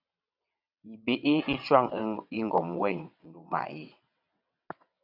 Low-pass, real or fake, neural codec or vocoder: 5.4 kHz; fake; vocoder, 22.05 kHz, 80 mel bands, WaveNeXt